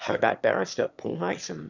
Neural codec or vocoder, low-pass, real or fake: autoencoder, 22.05 kHz, a latent of 192 numbers a frame, VITS, trained on one speaker; 7.2 kHz; fake